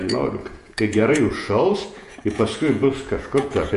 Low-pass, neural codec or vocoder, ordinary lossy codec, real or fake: 14.4 kHz; vocoder, 48 kHz, 128 mel bands, Vocos; MP3, 48 kbps; fake